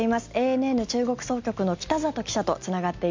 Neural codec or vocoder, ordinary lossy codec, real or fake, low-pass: none; MP3, 64 kbps; real; 7.2 kHz